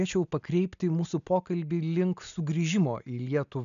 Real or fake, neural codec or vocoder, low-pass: real; none; 7.2 kHz